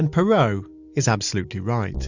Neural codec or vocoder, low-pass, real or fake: none; 7.2 kHz; real